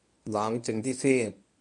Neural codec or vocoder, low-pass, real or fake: codec, 24 kHz, 0.9 kbps, WavTokenizer, medium speech release version 1; 10.8 kHz; fake